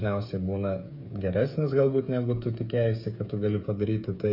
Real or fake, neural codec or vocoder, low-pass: fake; codec, 16 kHz, 8 kbps, FreqCodec, smaller model; 5.4 kHz